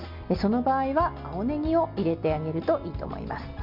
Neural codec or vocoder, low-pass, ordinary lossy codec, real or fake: none; 5.4 kHz; MP3, 48 kbps; real